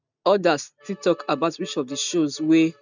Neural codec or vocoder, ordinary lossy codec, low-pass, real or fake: none; none; 7.2 kHz; real